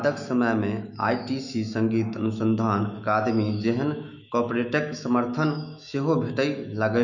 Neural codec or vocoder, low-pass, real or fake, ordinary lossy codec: autoencoder, 48 kHz, 128 numbers a frame, DAC-VAE, trained on Japanese speech; 7.2 kHz; fake; none